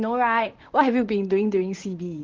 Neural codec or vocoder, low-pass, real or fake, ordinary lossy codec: vocoder, 44.1 kHz, 128 mel bands, Pupu-Vocoder; 7.2 kHz; fake; Opus, 16 kbps